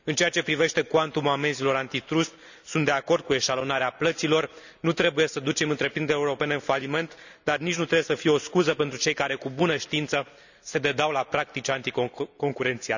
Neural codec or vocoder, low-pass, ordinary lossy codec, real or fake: none; 7.2 kHz; none; real